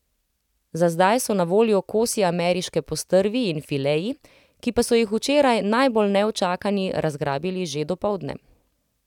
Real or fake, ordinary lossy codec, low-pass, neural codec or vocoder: real; none; 19.8 kHz; none